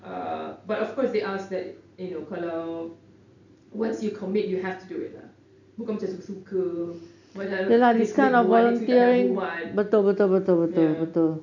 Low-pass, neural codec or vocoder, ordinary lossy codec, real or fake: 7.2 kHz; none; none; real